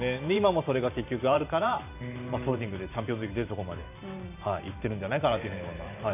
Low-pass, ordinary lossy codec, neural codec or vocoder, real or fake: 3.6 kHz; none; vocoder, 44.1 kHz, 128 mel bands every 512 samples, BigVGAN v2; fake